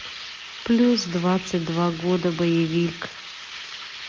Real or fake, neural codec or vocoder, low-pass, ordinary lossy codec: real; none; 7.2 kHz; Opus, 32 kbps